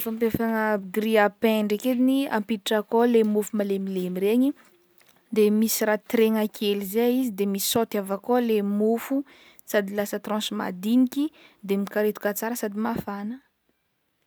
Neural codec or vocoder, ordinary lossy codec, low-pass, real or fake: none; none; none; real